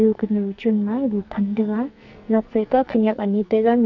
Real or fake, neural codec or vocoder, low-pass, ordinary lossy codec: fake; codec, 44.1 kHz, 2.6 kbps, SNAC; 7.2 kHz; none